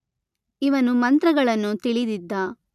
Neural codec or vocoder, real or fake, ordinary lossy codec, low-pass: none; real; none; 14.4 kHz